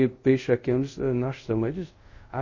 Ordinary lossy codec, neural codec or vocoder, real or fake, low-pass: MP3, 32 kbps; codec, 24 kHz, 0.5 kbps, DualCodec; fake; 7.2 kHz